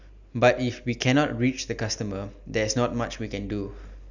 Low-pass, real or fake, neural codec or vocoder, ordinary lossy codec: 7.2 kHz; real; none; none